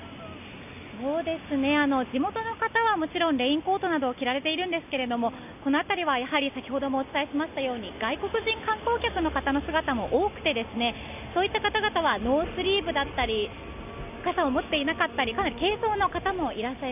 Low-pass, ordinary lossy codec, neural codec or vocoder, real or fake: 3.6 kHz; none; none; real